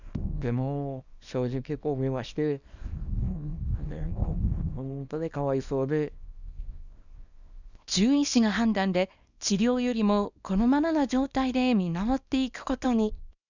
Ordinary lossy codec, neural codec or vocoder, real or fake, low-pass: none; codec, 24 kHz, 0.9 kbps, WavTokenizer, small release; fake; 7.2 kHz